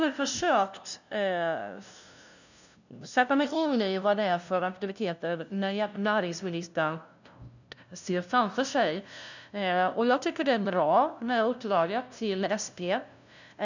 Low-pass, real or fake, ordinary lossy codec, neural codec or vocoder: 7.2 kHz; fake; none; codec, 16 kHz, 0.5 kbps, FunCodec, trained on LibriTTS, 25 frames a second